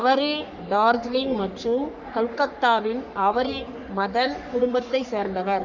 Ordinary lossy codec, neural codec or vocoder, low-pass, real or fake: none; codec, 44.1 kHz, 3.4 kbps, Pupu-Codec; 7.2 kHz; fake